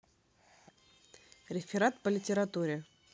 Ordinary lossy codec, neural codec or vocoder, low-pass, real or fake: none; none; none; real